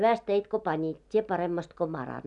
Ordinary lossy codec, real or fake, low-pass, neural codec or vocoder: none; real; 10.8 kHz; none